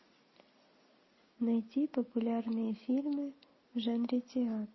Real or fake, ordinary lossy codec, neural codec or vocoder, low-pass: real; MP3, 24 kbps; none; 7.2 kHz